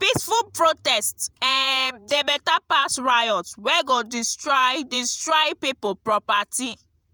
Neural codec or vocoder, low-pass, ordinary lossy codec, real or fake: vocoder, 48 kHz, 128 mel bands, Vocos; none; none; fake